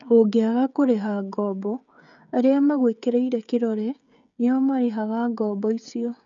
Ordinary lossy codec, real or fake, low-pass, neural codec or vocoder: none; fake; 7.2 kHz; codec, 16 kHz, 16 kbps, FreqCodec, smaller model